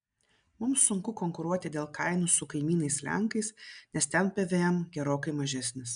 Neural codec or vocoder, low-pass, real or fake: none; 9.9 kHz; real